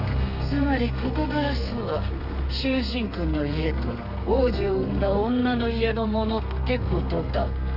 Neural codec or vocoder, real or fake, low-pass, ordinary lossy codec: codec, 32 kHz, 1.9 kbps, SNAC; fake; 5.4 kHz; none